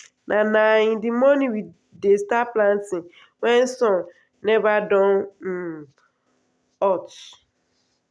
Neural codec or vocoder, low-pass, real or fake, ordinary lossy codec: none; none; real; none